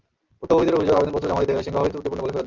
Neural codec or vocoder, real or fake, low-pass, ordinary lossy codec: none; real; 7.2 kHz; Opus, 32 kbps